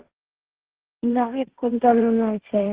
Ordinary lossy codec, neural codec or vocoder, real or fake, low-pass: Opus, 16 kbps; codec, 16 kHz, 1.1 kbps, Voila-Tokenizer; fake; 3.6 kHz